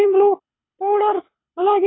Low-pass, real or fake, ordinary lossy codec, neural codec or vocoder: 7.2 kHz; fake; AAC, 16 kbps; codec, 24 kHz, 6 kbps, HILCodec